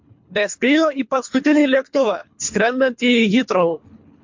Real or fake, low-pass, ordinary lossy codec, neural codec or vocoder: fake; 7.2 kHz; MP3, 48 kbps; codec, 24 kHz, 3 kbps, HILCodec